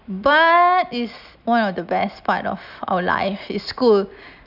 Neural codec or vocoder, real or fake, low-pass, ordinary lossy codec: none; real; 5.4 kHz; none